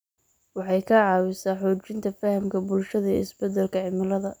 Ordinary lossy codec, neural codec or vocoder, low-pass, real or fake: none; none; none; real